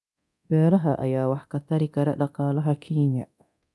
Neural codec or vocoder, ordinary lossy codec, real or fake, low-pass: codec, 24 kHz, 0.9 kbps, DualCodec; none; fake; none